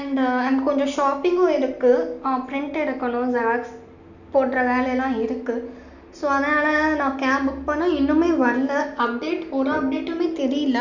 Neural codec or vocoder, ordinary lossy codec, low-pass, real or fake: none; none; 7.2 kHz; real